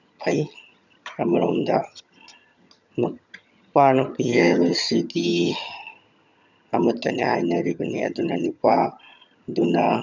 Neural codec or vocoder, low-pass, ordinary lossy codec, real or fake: vocoder, 22.05 kHz, 80 mel bands, HiFi-GAN; 7.2 kHz; none; fake